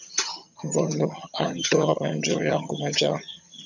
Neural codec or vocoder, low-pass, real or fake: vocoder, 22.05 kHz, 80 mel bands, HiFi-GAN; 7.2 kHz; fake